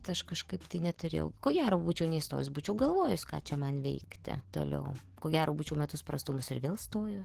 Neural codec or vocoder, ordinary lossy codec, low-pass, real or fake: codec, 44.1 kHz, 7.8 kbps, DAC; Opus, 16 kbps; 14.4 kHz; fake